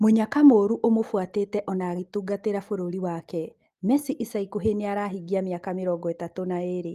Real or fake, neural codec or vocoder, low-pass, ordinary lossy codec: real; none; 14.4 kHz; Opus, 24 kbps